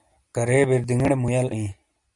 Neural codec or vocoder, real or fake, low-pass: none; real; 10.8 kHz